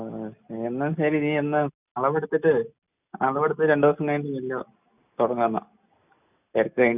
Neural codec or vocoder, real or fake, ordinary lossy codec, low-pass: none; real; none; 3.6 kHz